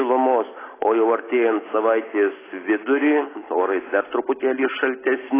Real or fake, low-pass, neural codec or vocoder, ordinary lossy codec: real; 3.6 kHz; none; AAC, 16 kbps